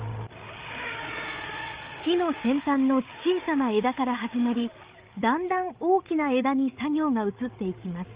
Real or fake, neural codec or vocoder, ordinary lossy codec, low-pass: fake; codec, 16 kHz, 8 kbps, FreqCodec, larger model; Opus, 32 kbps; 3.6 kHz